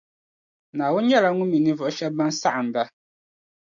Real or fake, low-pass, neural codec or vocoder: real; 7.2 kHz; none